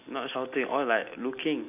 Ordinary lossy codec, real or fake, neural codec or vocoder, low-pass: none; real; none; 3.6 kHz